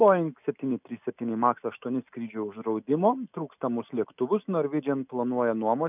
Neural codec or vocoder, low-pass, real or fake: none; 3.6 kHz; real